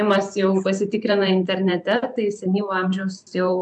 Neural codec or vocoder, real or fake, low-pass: vocoder, 44.1 kHz, 128 mel bands every 512 samples, BigVGAN v2; fake; 10.8 kHz